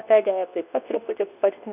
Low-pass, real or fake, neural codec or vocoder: 3.6 kHz; fake; codec, 24 kHz, 0.9 kbps, WavTokenizer, medium speech release version 2